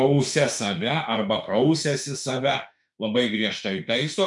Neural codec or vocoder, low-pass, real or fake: autoencoder, 48 kHz, 32 numbers a frame, DAC-VAE, trained on Japanese speech; 10.8 kHz; fake